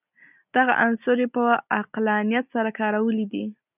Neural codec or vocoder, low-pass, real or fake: none; 3.6 kHz; real